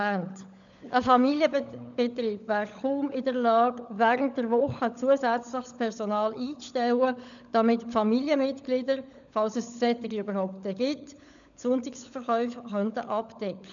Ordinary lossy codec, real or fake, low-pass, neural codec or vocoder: none; fake; 7.2 kHz; codec, 16 kHz, 16 kbps, FunCodec, trained on LibriTTS, 50 frames a second